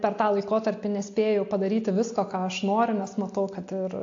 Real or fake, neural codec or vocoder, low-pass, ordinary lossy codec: real; none; 7.2 kHz; AAC, 48 kbps